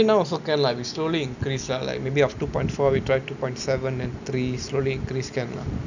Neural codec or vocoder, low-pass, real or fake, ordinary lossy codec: none; 7.2 kHz; real; none